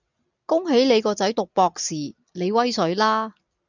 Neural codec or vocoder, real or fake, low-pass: none; real; 7.2 kHz